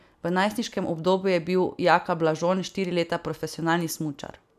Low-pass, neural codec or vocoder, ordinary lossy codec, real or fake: 14.4 kHz; none; none; real